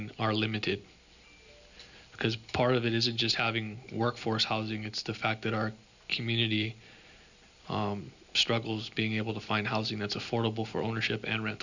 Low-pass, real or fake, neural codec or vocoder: 7.2 kHz; real; none